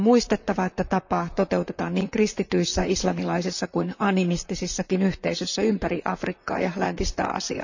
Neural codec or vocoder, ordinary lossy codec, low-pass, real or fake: vocoder, 44.1 kHz, 128 mel bands, Pupu-Vocoder; none; 7.2 kHz; fake